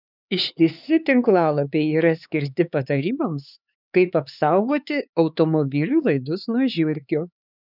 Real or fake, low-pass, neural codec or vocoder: fake; 5.4 kHz; codec, 16 kHz, 4 kbps, X-Codec, HuBERT features, trained on LibriSpeech